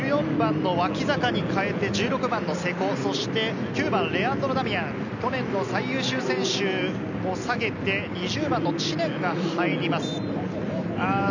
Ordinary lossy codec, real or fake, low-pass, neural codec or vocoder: none; real; 7.2 kHz; none